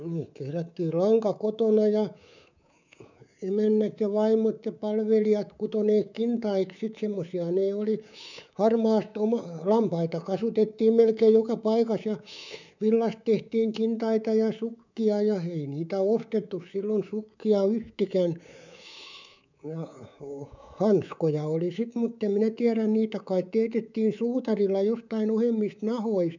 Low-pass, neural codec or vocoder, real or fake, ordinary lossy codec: 7.2 kHz; codec, 24 kHz, 3.1 kbps, DualCodec; fake; none